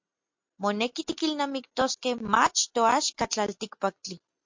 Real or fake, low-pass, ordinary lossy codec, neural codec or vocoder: real; 7.2 kHz; MP3, 48 kbps; none